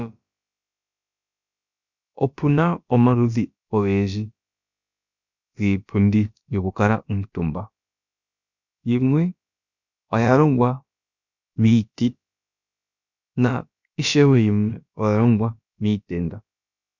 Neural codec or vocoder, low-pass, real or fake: codec, 16 kHz, about 1 kbps, DyCAST, with the encoder's durations; 7.2 kHz; fake